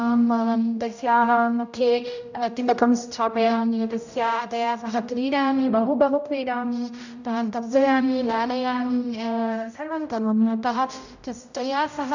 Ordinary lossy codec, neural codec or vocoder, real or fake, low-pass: none; codec, 16 kHz, 0.5 kbps, X-Codec, HuBERT features, trained on general audio; fake; 7.2 kHz